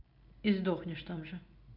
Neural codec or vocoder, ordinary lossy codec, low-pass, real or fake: none; none; 5.4 kHz; real